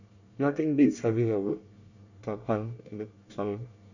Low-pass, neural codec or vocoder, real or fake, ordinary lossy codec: 7.2 kHz; codec, 24 kHz, 1 kbps, SNAC; fake; none